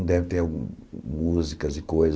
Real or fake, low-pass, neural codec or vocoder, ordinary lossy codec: real; none; none; none